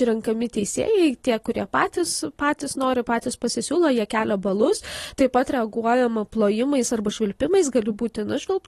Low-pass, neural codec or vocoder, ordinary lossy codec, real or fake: 19.8 kHz; none; AAC, 32 kbps; real